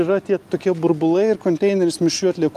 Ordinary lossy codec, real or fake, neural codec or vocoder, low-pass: Opus, 32 kbps; real; none; 14.4 kHz